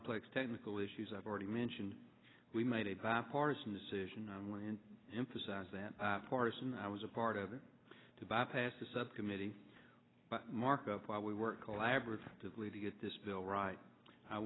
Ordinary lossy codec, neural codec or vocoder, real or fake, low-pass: AAC, 16 kbps; none; real; 7.2 kHz